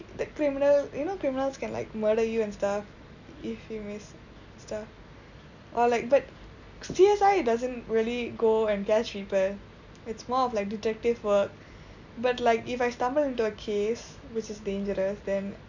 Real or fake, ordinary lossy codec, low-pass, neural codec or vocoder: real; none; 7.2 kHz; none